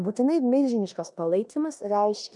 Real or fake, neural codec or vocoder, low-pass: fake; codec, 16 kHz in and 24 kHz out, 0.9 kbps, LongCat-Audio-Codec, four codebook decoder; 10.8 kHz